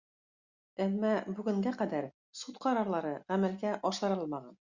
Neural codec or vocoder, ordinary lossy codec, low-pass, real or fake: none; Opus, 64 kbps; 7.2 kHz; real